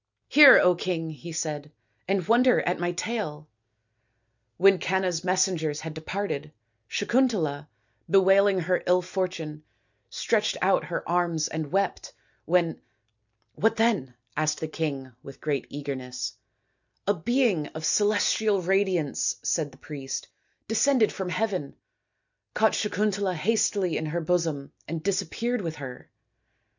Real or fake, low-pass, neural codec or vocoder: real; 7.2 kHz; none